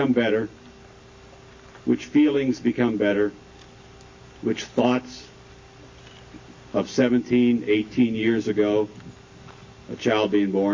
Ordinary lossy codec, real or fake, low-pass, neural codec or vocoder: MP3, 32 kbps; real; 7.2 kHz; none